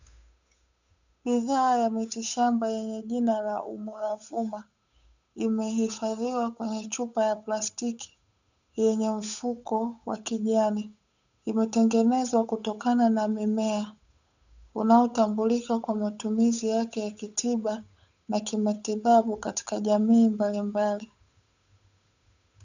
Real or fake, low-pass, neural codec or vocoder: fake; 7.2 kHz; codec, 16 kHz, 8 kbps, FunCodec, trained on Chinese and English, 25 frames a second